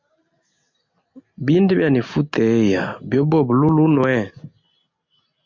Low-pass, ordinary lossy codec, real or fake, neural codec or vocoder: 7.2 kHz; MP3, 64 kbps; real; none